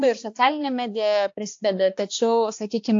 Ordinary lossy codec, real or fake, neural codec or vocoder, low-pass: AAC, 48 kbps; fake; codec, 16 kHz, 2 kbps, X-Codec, HuBERT features, trained on balanced general audio; 7.2 kHz